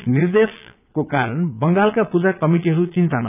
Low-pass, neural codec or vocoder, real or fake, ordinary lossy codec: 3.6 kHz; vocoder, 22.05 kHz, 80 mel bands, Vocos; fake; none